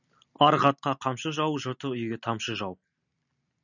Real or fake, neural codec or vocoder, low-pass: real; none; 7.2 kHz